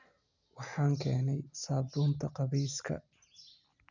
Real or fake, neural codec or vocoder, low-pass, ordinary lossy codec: real; none; 7.2 kHz; none